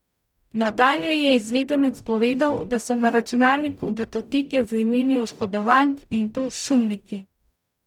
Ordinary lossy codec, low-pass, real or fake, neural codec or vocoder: none; 19.8 kHz; fake; codec, 44.1 kHz, 0.9 kbps, DAC